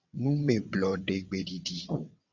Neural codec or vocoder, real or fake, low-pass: vocoder, 22.05 kHz, 80 mel bands, WaveNeXt; fake; 7.2 kHz